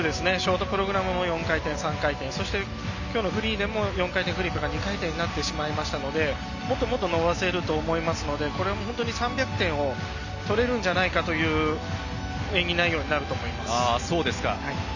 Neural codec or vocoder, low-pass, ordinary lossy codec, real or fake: none; 7.2 kHz; none; real